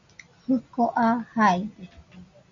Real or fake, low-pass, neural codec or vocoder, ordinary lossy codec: real; 7.2 kHz; none; MP3, 64 kbps